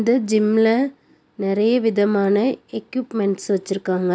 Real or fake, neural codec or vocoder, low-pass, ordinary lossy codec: real; none; none; none